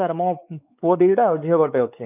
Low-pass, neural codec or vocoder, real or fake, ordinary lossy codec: 3.6 kHz; codec, 16 kHz, 4 kbps, X-Codec, WavLM features, trained on Multilingual LibriSpeech; fake; none